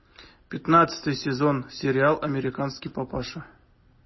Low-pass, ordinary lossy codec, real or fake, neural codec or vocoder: 7.2 kHz; MP3, 24 kbps; real; none